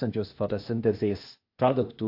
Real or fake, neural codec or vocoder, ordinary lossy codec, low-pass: fake; codec, 16 kHz, 0.8 kbps, ZipCodec; AAC, 32 kbps; 5.4 kHz